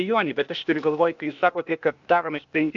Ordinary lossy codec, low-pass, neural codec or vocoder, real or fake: MP3, 64 kbps; 7.2 kHz; codec, 16 kHz, 0.8 kbps, ZipCodec; fake